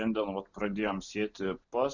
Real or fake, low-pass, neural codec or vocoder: real; 7.2 kHz; none